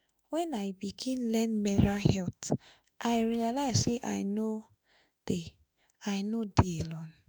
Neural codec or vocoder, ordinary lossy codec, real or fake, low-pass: autoencoder, 48 kHz, 32 numbers a frame, DAC-VAE, trained on Japanese speech; none; fake; none